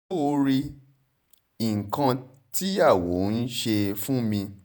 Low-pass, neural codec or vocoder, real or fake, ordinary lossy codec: none; vocoder, 48 kHz, 128 mel bands, Vocos; fake; none